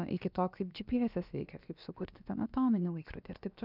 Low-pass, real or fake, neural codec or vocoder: 5.4 kHz; fake; codec, 16 kHz, about 1 kbps, DyCAST, with the encoder's durations